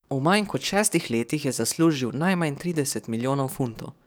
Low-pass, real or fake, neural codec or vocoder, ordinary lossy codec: none; fake; codec, 44.1 kHz, 7.8 kbps, DAC; none